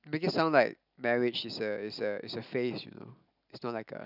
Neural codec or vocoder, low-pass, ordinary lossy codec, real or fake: none; 5.4 kHz; none; real